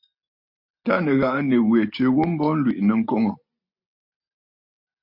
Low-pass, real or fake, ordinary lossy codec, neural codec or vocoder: 5.4 kHz; real; MP3, 48 kbps; none